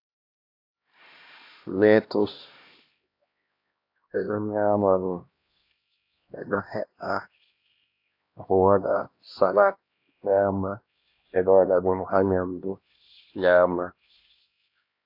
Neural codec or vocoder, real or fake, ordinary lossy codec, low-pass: codec, 16 kHz, 1 kbps, X-Codec, HuBERT features, trained on LibriSpeech; fake; AAC, 32 kbps; 5.4 kHz